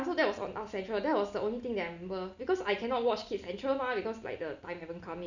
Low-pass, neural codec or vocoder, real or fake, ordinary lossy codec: 7.2 kHz; none; real; none